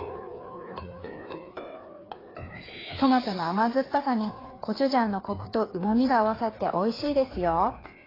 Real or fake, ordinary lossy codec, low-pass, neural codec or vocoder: fake; AAC, 24 kbps; 5.4 kHz; codec, 16 kHz, 2 kbps, FreqCodec, larger model